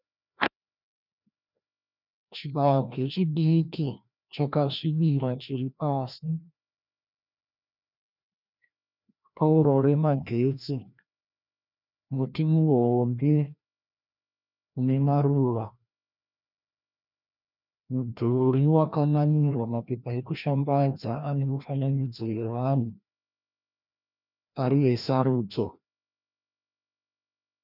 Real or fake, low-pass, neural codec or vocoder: fake; 5.4 kHz; codec, 16 kHz, 1 kbps, FreqCodec, larger model